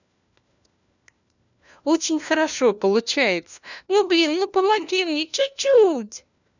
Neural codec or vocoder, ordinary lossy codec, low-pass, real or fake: codec, 16 kHz, 1 kbps, FunCodec, trained on LibriTTS, 50 frames a second; none; 7.2 kHz; fake